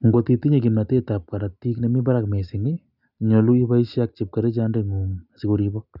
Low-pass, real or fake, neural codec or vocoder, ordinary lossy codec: 5.4 kHz; real; none; none